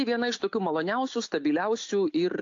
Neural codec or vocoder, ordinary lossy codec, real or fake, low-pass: none; AAC, 48 kbps; real; 7.2 kHz